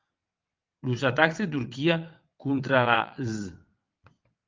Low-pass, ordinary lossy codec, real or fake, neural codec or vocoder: 7.2 kHz; Opus, 32 kbps; fake; vocoder, 22.05 kHz, 80 mel bands, WaveNeXt